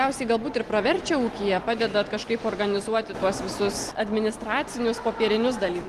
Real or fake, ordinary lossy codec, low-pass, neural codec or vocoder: real; Opus, 24 kbps; 14.4 kHz; none